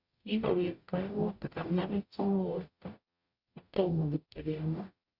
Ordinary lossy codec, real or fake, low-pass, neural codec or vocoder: Opus, 64 kbps; fake; 5.4 kHz; codec, 44.1 kHz, 0.9 kbps, DAC